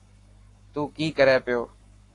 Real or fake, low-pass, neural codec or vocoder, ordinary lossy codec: fake; 10.8 kHz; codec, 44.1 kHz, 7.8 kbps, Pupu-Codec; AAC, 48 kbps